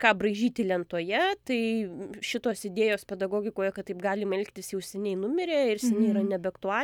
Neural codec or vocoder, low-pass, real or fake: none; 19.8 kHz; real